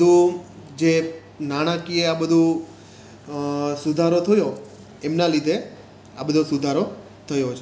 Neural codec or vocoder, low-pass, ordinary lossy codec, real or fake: none; none; none; real